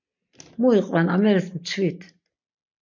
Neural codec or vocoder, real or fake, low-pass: none; real; 7.2 kHz